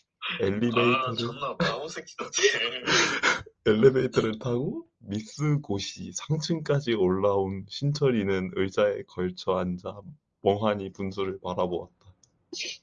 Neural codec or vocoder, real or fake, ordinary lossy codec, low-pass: none; real; Opus, 24 kbps; 7.2 kHz